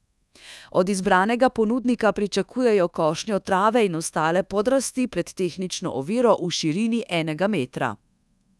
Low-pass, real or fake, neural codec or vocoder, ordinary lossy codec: none; fake; codec, 24 kHz, 1.2 kbps, DualCodec; none